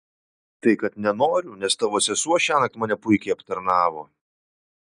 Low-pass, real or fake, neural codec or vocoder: 9.9 kHz; real; none